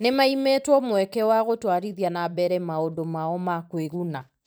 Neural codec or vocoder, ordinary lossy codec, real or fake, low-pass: vocoder, 44.1 kHz, 128 mel bands every 512 samples, BigVGAN v2; none; fake; none